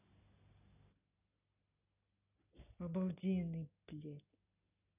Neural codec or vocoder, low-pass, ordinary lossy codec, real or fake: none; 3.6 kHz; none; real